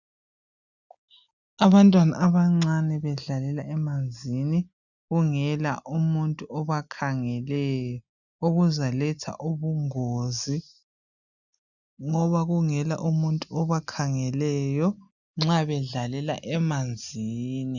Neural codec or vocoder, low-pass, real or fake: none; 7.2 kHz; real